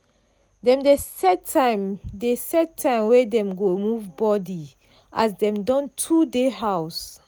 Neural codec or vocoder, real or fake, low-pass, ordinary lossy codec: none; real; none; none